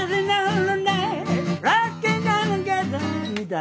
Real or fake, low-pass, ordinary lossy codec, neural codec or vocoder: real; none; none; none